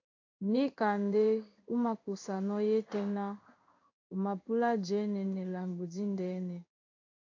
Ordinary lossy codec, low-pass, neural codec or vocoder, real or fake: AAC, 48 kbps; 7.2 kHz; codec, 16 kHz in and 24 kHz out, 1 kbps, XY-Tokenizer; fake